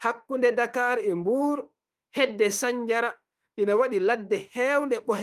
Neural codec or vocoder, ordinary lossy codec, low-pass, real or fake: autoencoder, 48 kHz, 32 numbers a frame, DAC-VAE, trained on Japanese speech; Opus, 32 kbps; 14.4 kHz; fake